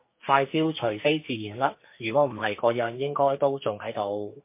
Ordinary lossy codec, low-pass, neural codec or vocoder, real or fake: MP3, 24 kbps; 3.6 kHz; codec, 44.1 kHz, 2.6 kbps, SNAC; fake